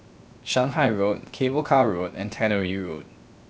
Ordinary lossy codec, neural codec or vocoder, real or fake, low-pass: none; codec, 16 kHz, 0.7 kbps, FocalCodec; fake; none